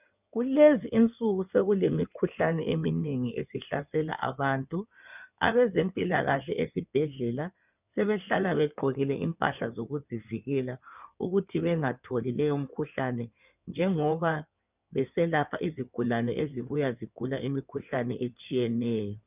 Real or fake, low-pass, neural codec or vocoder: fake; 3.6 kHz; codec, 16 kHz in and 24 kHz out, 2.2 kbps, FireRedTTS-2 codec